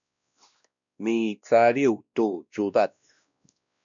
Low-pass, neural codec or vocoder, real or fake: 7.2 kHz; codec, 16 kHz, 1 kbps, X-Codec, WavLM features, trained on Multilingual LibriSpeech; fake